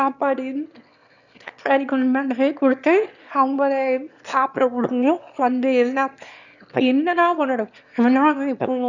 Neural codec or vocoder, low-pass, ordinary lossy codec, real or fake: autoencoder, 22.05 kHz, a latent of 192 numbers a frame, VITS, trained on one speaker; 7.2 kHz; none; fake